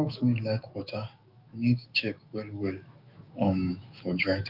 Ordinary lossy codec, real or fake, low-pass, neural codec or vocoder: Opus, 24 kbps; fake; 5.4 kHz; autoencoder, 48 kHz, 128 numbers a frame, DAC-VAE, trained on Japanese speech